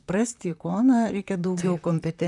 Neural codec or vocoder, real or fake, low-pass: vocoder, 44.1 kHz, 128 mel bands, Pupu-Vocoder; fake; 10.8 kHz